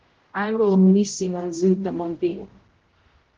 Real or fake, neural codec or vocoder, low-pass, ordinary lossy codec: fake; codec, 16 kHz, 0.5 kbps, X-Codec, HuBERT features, trained on general audio; 7.2 kHz; Opus, 16 kbps